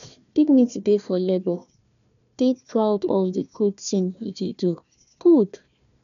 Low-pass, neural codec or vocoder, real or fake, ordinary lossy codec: 7.2 kHz; codec, 16 kHz, 1 kbps, FunCodec, trained on Chinese and English, 50 frames a second; fake; none